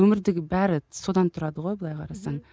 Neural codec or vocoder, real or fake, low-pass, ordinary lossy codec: none; real; none; none